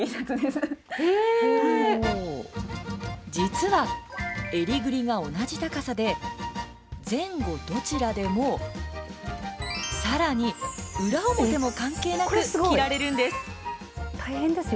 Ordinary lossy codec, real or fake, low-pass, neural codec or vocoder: none; real; none; none